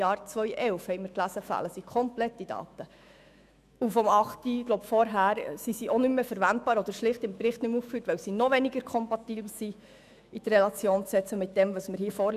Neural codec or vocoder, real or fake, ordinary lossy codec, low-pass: autoencoder, 48 kHz, 128 numbers a frame, DAC-VAE, trained on Japanese speech; fake; none; 14.4 kHz